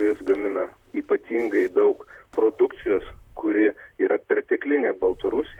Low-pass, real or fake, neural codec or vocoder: 19.8 kHz; fake; vocoder, 44.1 kHz, 128 mel bands, Pupu-Vocoder